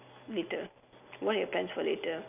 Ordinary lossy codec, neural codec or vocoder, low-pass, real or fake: none; none; 3.6 kHz; real